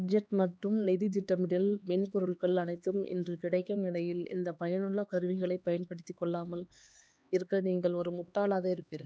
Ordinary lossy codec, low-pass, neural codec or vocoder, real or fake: none; none; codec, 16 kHz, 2 kbps, X-Codec, HuBERT features, trained on LibriSpeech; fake